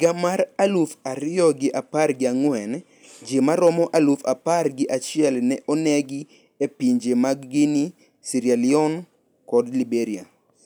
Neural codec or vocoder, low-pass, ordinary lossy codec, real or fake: none; none; none; real